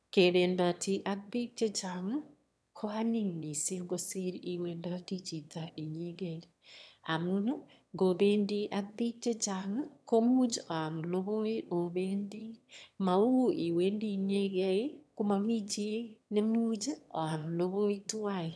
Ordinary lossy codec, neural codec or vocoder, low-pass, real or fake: none; autoencoder, 22.05 kHz, a latent of 192 numbers a frame, VITS, trained on one speaker; none; fake